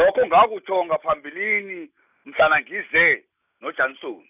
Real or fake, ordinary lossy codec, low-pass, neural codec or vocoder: real; none; 3.6 kHz; none